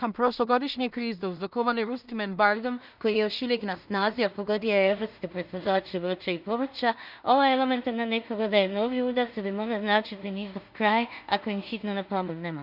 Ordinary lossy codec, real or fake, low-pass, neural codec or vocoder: none; fake; 5.4 kHz; codec, 16 kHz in and 24 kHz out, 0.4 kbps, LongCat-Audio-Codec, two codebook decoder